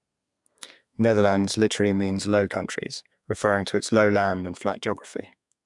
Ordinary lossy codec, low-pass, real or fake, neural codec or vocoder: none; 10.8 kHz; fake; codec, 32 kHz, 1.9 kbps, SNAC